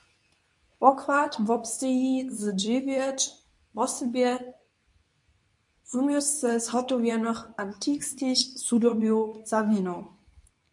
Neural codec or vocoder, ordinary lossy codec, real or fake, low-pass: codec, 24 kHz, 0.9 kbps, WavTokenizer, medium speech release version 2; MP3, 64 kbps; fake; 10.8 kHz